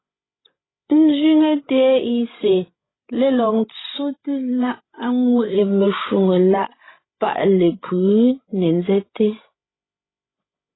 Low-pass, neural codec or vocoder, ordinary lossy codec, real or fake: 7.2 kHz; codec, 16 kHz, 16 kbps, FreqCodec, larger model; AAC, 16 kbps; fake